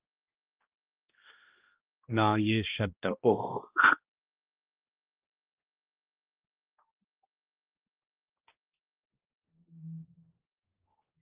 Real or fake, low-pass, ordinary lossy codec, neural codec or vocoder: fake; 3.6 kHz; Opus, 32 kbps; codec, 16 kHz, 1 kbps, X-Codec, HuBERT features, trained on balanced general audio